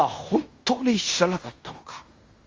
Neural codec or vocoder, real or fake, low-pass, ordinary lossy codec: codec, 16 kHz in and 24 kHz out, 0.9 kbps, LongCat-Audio-Codec, fine tuned four codebook decoder; fake; 7.2 kHz; Opus, 32 kbps